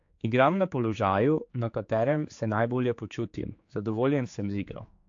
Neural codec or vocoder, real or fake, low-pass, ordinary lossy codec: codec, 16 kHz, 4 kbps, X-Codec, HuBERT features, trained on general audio; fake; 7.2 kHz; AAC, 48 kbps